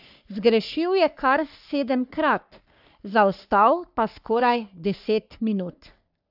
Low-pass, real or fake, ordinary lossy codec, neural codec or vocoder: 5.4 kHz; fake; AAC, 48 kbps; codec, 44.1 kHz, 3.4 kbps, Pupu-Codec